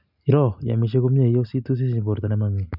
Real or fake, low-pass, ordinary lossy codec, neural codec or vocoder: real; 5.4 kHz; none; none